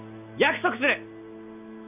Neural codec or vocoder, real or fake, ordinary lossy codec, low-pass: none; real; none; 3.6 kHz